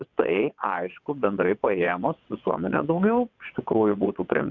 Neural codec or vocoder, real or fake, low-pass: vocoder, 22.05 kHz, 80 mel bands, WaveNeXt; fake; 7.2 kHz